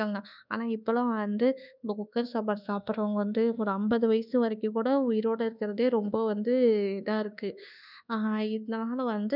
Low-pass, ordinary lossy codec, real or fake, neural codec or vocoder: 5.4 kHz; none; fake; codec, 24 kHz, 1.2 kbps, DualCodec